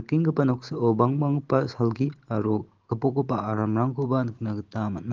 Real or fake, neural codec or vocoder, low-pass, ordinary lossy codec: real; none; 7.2 kHz; Opus, 16 kbps